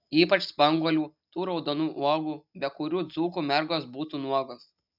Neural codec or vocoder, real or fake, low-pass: none; real; 5.4 kHz